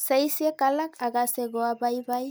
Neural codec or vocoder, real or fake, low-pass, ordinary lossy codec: none; real; none; none